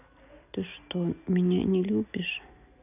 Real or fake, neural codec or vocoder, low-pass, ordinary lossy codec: real; none; 3.6 kHz; none